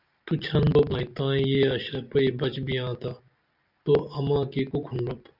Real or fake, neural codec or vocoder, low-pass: real; none; 5.4 kHz